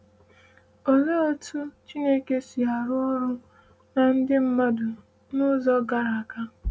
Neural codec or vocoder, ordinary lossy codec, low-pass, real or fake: none; none; none; real